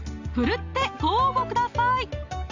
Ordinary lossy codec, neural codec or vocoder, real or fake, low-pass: AAC, 48 kbps; none; real; 7.2 kHz